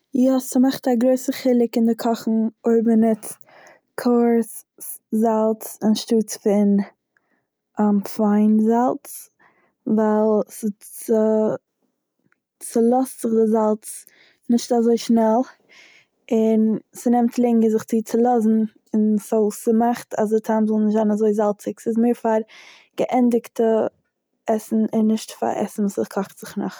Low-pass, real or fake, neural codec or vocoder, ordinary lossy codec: none; real; none; none